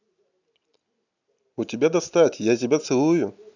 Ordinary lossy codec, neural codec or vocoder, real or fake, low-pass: none; none; real; 7.2 kHz